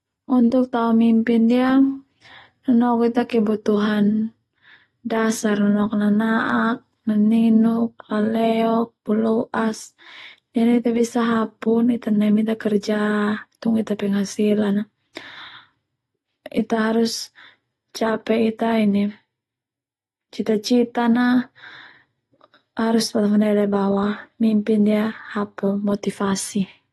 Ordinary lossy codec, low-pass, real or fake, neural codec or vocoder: AAC, 32 kbps; 19.8 kHz; real; none